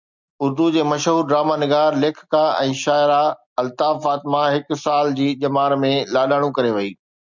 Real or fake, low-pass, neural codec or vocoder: real; 7.2 kHz; none